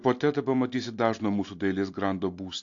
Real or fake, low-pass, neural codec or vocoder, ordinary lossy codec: real; 7.2 kHz; none; AAC, 48 kbps